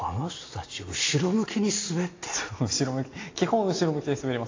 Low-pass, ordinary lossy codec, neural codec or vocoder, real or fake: 7.2 kHz; AAC, 32 kbps; none; real